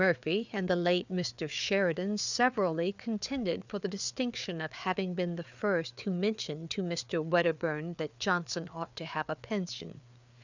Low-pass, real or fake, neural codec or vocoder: 7.2 kHz; fake; codec, 16 kHz, 4 kbps, FreqCodec, larger model